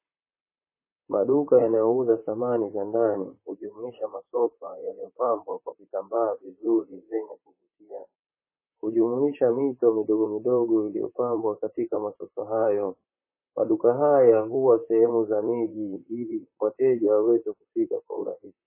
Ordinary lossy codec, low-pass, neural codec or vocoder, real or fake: MP3, 16 kbps; 3.6 kHz; vocoder, 44.1 kHz, 128 mel bands, Pupu-Vocoder; fake